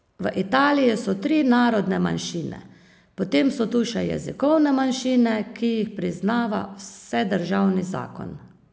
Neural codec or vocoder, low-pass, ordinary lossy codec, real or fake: none; none; none; real